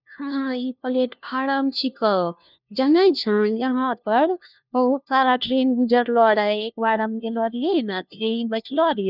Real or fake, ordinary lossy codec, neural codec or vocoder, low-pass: fake; none; codec, 16 kHz, 1 kbps, FunCodec, trained on LibriTTS, 50 frames a second; 5.4 kHz